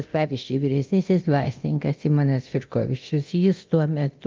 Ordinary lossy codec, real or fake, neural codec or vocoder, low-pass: Opus, 24 kbps; fake; codec, 24 kHz, 0.9 kbps, DualCodec; 7.2 kHz